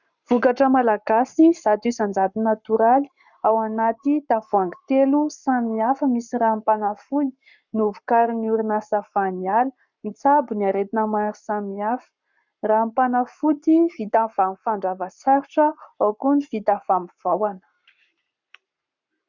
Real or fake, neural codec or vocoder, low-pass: fake; codec, 44.1 kHz, 7.8 kbps, Pupu-Codec; 7.2 kHz